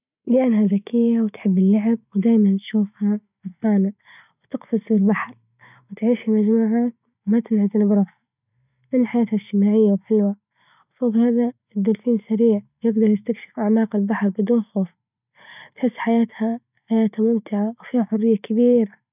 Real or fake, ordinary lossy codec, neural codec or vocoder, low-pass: real; none; none; 3.6 kHz